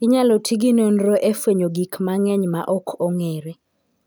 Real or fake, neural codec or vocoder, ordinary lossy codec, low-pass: real; none; none; none